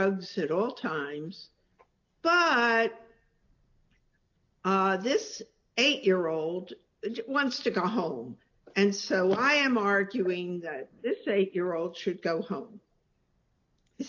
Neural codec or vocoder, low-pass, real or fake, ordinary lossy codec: none; 7.2 kHz; real; AAC, 48 kbps